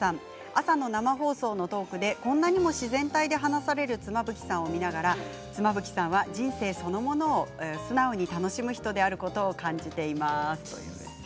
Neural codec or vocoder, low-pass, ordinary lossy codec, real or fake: none; none; none; real